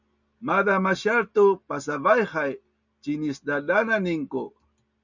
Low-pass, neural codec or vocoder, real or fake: 7.2 kHz; none; real